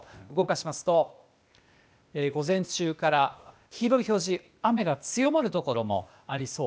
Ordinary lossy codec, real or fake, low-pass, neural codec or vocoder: none; fake; none; codec, 16 kHz, 0.8 kbps, ZipCodec